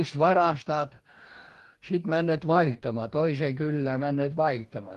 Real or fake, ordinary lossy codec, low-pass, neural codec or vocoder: fake; Opus, 24 kbps; 14.4 kHz; codec, 44.1 kHz, 2.6 kbps, DAC